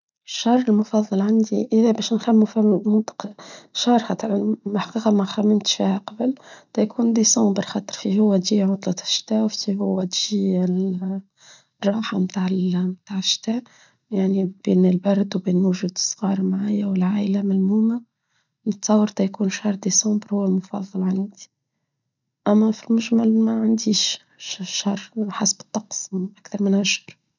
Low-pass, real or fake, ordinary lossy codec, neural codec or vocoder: 7.2 kHz; real; none; none